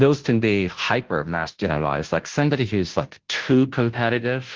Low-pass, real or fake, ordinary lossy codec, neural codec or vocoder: 7.2 kHz; fake; Opus, 16 kbps; codec, 16 kHz, 0.5 kbps, FunCodec, trained on Chinese and English, 25 frames a second